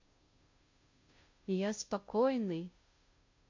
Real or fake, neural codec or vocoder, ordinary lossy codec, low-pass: fake; codec, 16 kHz, 0.5 kbps, FunCodec, trained on Chinese and English, 25 frames a second; MP3, 48 kbps; 7.2 kHz